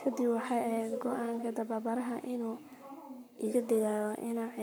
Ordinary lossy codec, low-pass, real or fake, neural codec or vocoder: none; none; fake; codec, 44.1 kHz, 7.8 kbps, Pupu-Codec